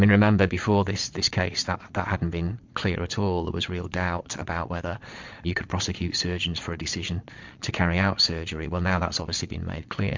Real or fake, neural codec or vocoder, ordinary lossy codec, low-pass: fake; vocoder, 22.05 kHz, 80 mel bands, Vocos; MP3, 64 kbps; 7.2 kHz